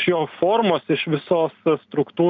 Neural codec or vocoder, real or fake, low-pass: none; real; 7.2 kHz